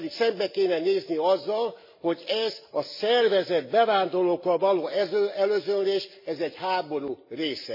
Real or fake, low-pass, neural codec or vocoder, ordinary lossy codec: real; 5.4 kHz; none; MP3, 24 kbps